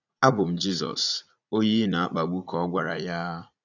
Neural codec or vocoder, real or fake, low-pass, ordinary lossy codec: vocoder, 44.1 kHz, 80 mel bands, Vocos; fake; 7.2 kHz; none